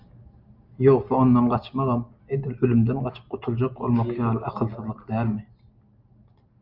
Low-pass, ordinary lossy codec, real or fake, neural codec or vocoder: 5.4 kHz; Opus, 24 kbps; real; none